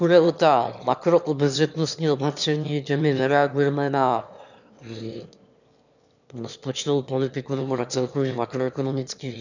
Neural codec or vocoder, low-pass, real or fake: autoencoder, 22.05 kHz, a latent of 192 numbers a frame, VITS, trained on one speaker; 7.2 kHz; fake